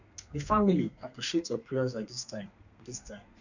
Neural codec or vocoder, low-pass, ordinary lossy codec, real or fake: codec, 32 kHz, 1.9 kbps, SNAC; 7.2 kHz; none; fake